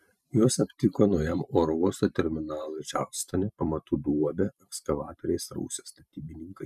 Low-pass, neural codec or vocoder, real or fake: 14.4 kHz; vocoder, 44.1 kHz, 128 mel bands every 512 samples, BigVGAN v2; fake